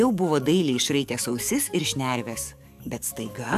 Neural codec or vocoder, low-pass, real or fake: codec, 44.1 kHz, 7.8 kbps, DAC; 14.4 kHz; fake